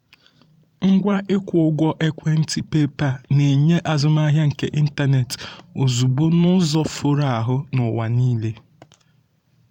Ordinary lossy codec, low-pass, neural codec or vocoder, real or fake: none; 19.8 kHz; vocoder, 44.1 kHz, 128 mel bands every 512 samples, BigVGAN v2; fake